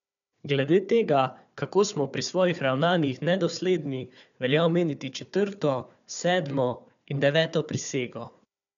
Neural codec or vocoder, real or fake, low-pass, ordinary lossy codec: codec, 16 kHz, 4 kbps, FunCodec, trained on Chinese and English, 50 frames a second; fake; 7.2 kHz; none